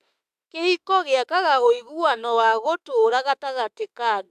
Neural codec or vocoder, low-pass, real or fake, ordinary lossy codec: autoencoder, 48 kHz, 32 numbers a frame, DAC-VAE, trained on Japanese speech; 14.4 kHz; fake; AAC, 96 kbps